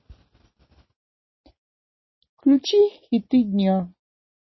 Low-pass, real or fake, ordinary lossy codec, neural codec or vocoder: 7.2 kHz; real; MP3, 24 kbps; none